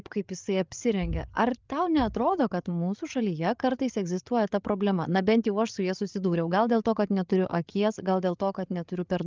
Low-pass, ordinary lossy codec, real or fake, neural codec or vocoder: 7.2 kHz; Opus, 32 kbps; fake; codec, 16 kHz, 8 kbps, FreqCodec, larger model